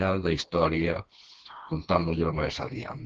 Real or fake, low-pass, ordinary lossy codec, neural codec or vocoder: fake; 7.2 kHz; Opus, 16 kbps; codec, 16 kHz, 2 kbps, FreqCodec, smaller model